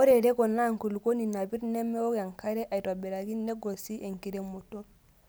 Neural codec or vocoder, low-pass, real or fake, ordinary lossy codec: none; none; real; none